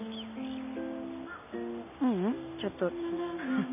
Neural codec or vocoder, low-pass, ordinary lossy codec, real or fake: none; 3.6 kHz; none; real